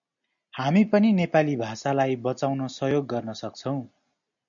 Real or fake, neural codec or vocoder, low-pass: real; none; 7.2 kHz